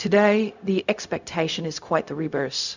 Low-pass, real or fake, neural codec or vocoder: 7.2 kHz; fake; codec, 16 kHz, 0.4 kbps, LongCat-Audio-Codec